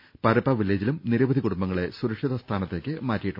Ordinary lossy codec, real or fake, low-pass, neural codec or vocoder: none; real; 5.4 kHz; none